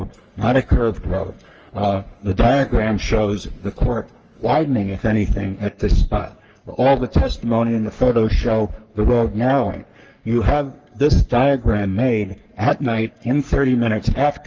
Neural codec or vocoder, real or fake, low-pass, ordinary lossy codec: codec, 44.1 kHz, 3.4 kbps, Pupu-Codec; fake; 7.2 kHz; Opus, 24 kbps